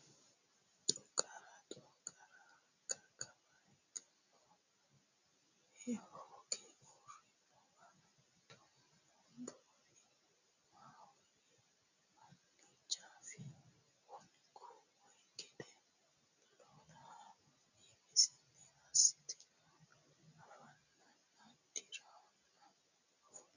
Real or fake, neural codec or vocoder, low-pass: real; none; 7.2 kHz